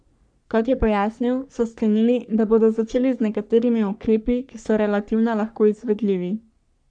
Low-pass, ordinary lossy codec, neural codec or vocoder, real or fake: 9.9 kHz; none; codec, 44.1 kHz, 3.4 kbps, Pupu-Codec; fake